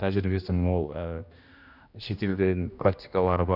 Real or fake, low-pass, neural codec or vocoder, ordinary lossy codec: fake; 5.4 kHz; codec, 16 kHz, 1 kbps, X-Codec, HuBERT features, trained on general audio; none